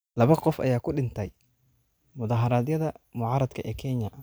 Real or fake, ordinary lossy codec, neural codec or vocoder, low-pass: real; none; none; none